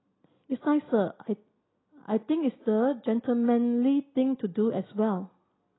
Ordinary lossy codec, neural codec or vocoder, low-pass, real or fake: AAC, 16 kbps; none; 7.2 kHz; real